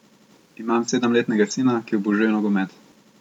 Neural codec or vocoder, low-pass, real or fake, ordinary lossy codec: none; 19.8 kHz; real; none